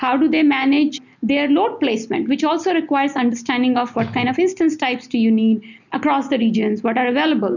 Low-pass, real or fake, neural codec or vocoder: 7.2 kHz; real; none